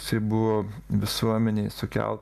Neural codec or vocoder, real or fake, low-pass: none; real; 14.4 kHz